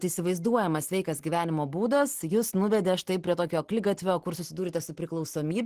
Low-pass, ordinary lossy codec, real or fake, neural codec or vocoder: 14.4 kHz; Opus, 16 kbps; real; none